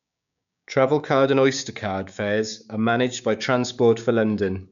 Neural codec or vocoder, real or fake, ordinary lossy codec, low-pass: codec, 16 kHz, 6 kbps, DAC; fake; none; 7.2 kHz